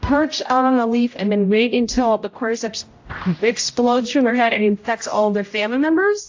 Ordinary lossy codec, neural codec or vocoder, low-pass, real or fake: AAC, 48 kbps; codec, 16 kHz, 0.5 kbps, X-Codec, HuBERT features, trained on general audio; 7.2 kHz; fake